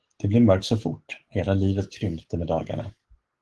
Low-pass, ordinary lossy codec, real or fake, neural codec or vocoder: 10.8 kHz; Opus, 16 kbps; fake; codec, 44.1 kHz, 7.8 kbps, DAC